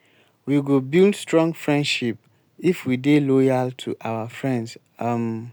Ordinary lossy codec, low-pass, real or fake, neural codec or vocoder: none; none; real; none